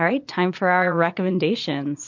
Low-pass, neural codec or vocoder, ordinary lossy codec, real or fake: 7.2 kHz; vocoder, 22.05 kHz, 80 mel bands, Vocos; MP3, 48 kbps; fake